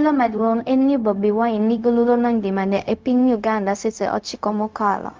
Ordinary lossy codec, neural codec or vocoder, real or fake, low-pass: Opus, 24 kbps; codec, 16 kHz, 0.4 kbps, LongCat-Audio-Codec; fake; 7.2 kHz